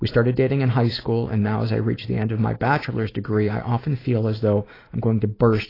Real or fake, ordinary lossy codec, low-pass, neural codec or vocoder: real; AAC, 24 kbps; 5.4 kHz; none